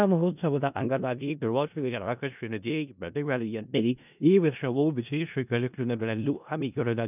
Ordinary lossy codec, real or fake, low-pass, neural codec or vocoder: none; fake; 3.6 kHz; codec, 16 kHz in and 24 kHz out, 0.4 kbps, LongCat-Audio-Codec, four codebook decoder